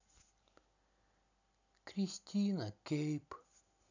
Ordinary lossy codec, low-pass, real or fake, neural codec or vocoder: none; 7.2 kHz; real; none